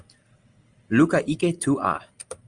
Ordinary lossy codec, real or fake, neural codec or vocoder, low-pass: Opus, 32 kbps; real; none; 9.9 kHz